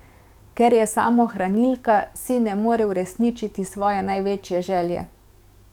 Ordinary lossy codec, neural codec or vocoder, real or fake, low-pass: none; codec, 44.1 kHz, 7.8 kbps, DAC; fake; 19.8 kHz